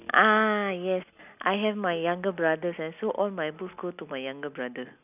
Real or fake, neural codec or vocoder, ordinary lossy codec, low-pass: real; none; none; 3.6 kHz